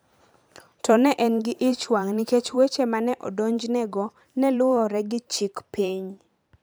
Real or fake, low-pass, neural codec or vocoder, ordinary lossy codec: fake; none; vocoder, 44.1 kHz, 128 mel bands every 512 samples, BigVGAN v2; none